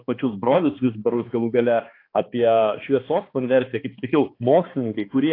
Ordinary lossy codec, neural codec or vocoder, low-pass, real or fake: AAC, 32 kbps; codec, 16 kHz, 2 kbps, X-Codec, HuBERT features, trained on balanced general audio; 5.4 kHz; fake